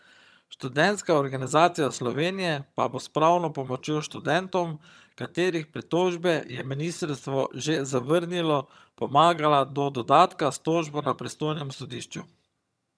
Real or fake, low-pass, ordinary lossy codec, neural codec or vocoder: fake; none; none; vocoder, 22.05 kHz, 80 mel bands, HiFi-GAN